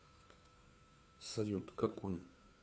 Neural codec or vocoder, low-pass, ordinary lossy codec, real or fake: codec, 16 kHz, 2 kbps, FunCodec, trained on Chinese and English, 25 frames a second; none; none; fake